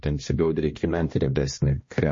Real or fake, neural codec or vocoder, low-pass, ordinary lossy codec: fake; codec, 16 kHz, 1 kbps, X-Codec, HuBERT features, trained on balanced general audio; 7.2 kHz; MP3, 32 kbps